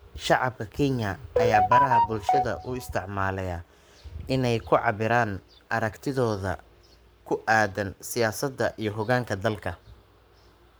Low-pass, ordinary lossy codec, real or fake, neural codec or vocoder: none; none; fake; codec, 44.1 kHz, 7.8 kbps, Pupu-Codec